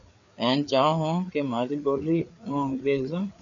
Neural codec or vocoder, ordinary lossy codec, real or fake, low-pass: codec, 16 kHz, 4 kbps, FreqCodec, larger model; AAC, 64 kbps; fake; 7.2 kHz